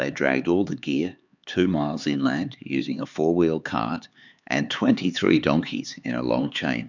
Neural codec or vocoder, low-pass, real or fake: codec, 16 kHz, 4 kbps, X-Codec, HuBERT features, trained on LibriSpeech; 7.2 kHz; fake